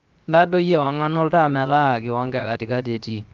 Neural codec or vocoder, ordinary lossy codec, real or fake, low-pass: codec, 16 kHz, 0.7 kbps, FocalCodec; Opus, 32 kbps; fake; 7.2 kHz